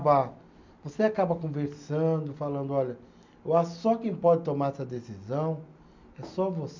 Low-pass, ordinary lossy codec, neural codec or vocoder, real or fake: 7.2 kHz; MP3, 64 kbps; none; real